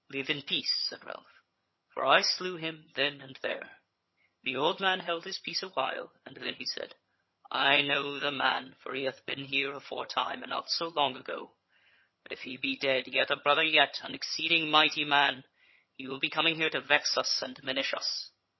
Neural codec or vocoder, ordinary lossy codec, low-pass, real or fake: vocoder, 22.05 kHz, 80 mel bands, HiFi-GAN; MP3, 24 kbps; 7.2 kHz; fake